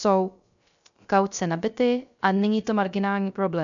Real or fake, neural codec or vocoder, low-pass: fake; codec, 16 kHz, 0.3 kbps, FocalCodec; 7.2 kHz